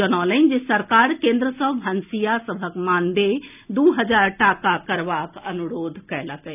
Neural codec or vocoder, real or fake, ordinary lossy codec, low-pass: none; real; none; 3.6 kHz